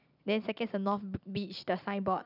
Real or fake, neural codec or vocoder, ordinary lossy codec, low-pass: real; none; none; 5.4 kHz